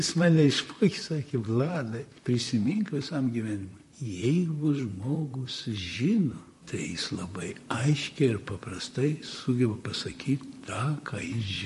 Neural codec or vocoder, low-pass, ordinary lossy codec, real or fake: vocoder, 44.1 kHz, 128 mel bands, Pupu-Vocoder; 14.4 kHz; MP3, 48 kbps; fake